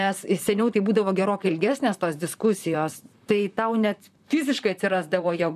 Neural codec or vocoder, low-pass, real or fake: vocoder, 44.1 kHz, 128 mel bands, Pupu-Vocoder; 14.4 kHz; fake